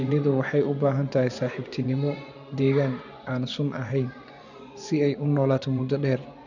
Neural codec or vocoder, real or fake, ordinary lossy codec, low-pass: vocoder, 24 kHz, 100 mel bands, Vocos; fake; none; 7.2 kHz